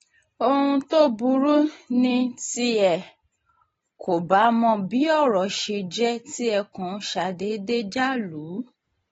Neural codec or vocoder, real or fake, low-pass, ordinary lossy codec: none; real; 19.8 kHz; AAC, 24 kbps